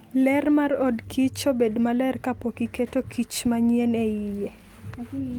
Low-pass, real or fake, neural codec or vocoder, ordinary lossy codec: 19.8 kHz; real; none; Opus, 24 kbps